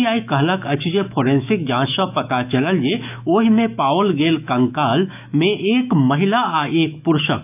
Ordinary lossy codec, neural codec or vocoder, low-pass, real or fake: none; autoencoder, 48 kHz, 128 numbers a frame, DAC-VAE, trained on Japanese speech; 3.6 kHz; fake